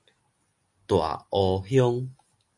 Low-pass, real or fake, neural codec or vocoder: 10.8 kHz; real; none